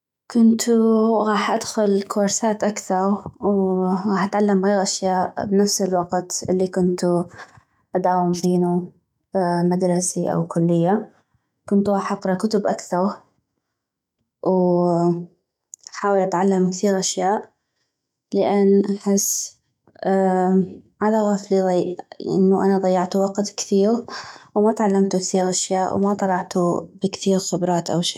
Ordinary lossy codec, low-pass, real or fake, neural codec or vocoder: none; 19.8 kHz; fake; autoencoder, 48 kHz, 128 numbers a frame, DAC-VAE, trained on Japanese speech